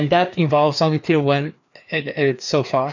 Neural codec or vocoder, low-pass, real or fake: codec, 16 kHz, 2 kbps, FreqCodec, larger model; 7.2 kHz; fake